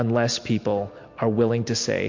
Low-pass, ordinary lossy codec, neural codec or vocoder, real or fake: 7.2 kHz; MP3, 48 kbps; none; real